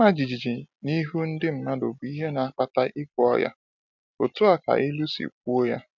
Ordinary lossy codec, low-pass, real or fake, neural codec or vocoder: none; 7.2 kHz; real; none